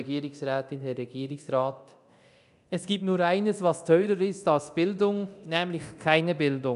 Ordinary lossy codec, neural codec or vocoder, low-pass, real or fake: none; codec, 24 kHz, 0.9 kbps, DualCodec; 10.8 kHz; fake